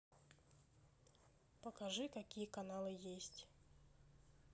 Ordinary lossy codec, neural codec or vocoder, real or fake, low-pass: none; none; real; none